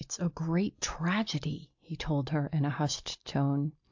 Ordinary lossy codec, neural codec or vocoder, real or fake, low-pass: AAC, 48 kbps; none; real; 7.2 kHz